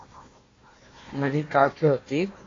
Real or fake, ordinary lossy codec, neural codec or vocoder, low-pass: fake; AAC, 32 kbps; codec, 16 kHz, 1 kbps, FunCodec, trained on Chinese and English, 50 frames a second; 7.2 kHz